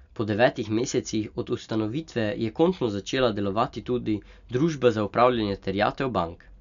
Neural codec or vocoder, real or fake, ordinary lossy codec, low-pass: none; real; none; 7.2 kHz